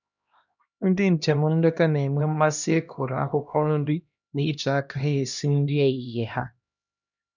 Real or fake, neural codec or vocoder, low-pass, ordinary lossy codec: fake; codec, 16 kHz, 1 kbps, X-Codec, HuBERT features, trained on LibriSpeech; 7.2 kHz; none